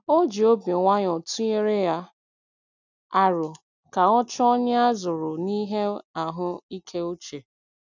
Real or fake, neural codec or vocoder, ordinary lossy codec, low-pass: real; none; none; 7.2 kHz